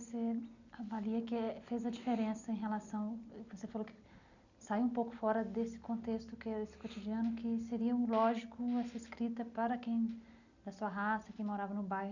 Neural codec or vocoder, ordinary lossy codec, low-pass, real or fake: none; none; 7.2 kHz; real